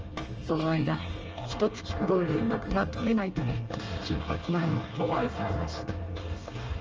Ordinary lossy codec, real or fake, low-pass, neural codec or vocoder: Opus, 24 kbps; fake; 7.2 kHz; codec, 24 kHz, 1 kbps, SNAC